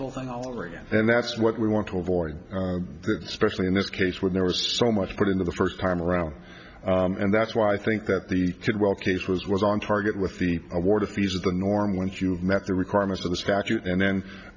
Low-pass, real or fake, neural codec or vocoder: 7.2 kHz; real; none